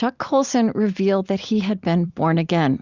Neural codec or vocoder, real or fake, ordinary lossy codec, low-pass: none; real; Opus, 64 kbps; 7.2 kHz